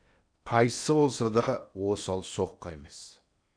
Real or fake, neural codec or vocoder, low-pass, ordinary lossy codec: fake; codec, 16 kHz in and 24 kHz out, 0.8 kbps, FocalCodec, streaming, 65536 codes; 9.9 kHz; none